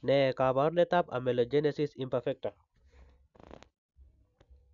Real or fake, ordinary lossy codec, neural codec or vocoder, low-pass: real; none; none; 7.2 kHz